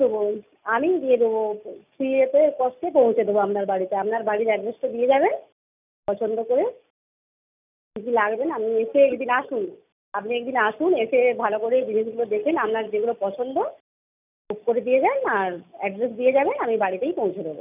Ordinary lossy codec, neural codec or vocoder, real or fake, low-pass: none; none; real; 3.6 kHz